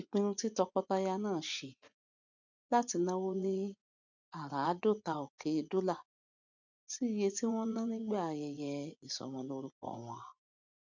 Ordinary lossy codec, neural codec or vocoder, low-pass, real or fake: none; vocoder, 24 kHz, 100 mel bands, Vocos; 7.2 kHz; fake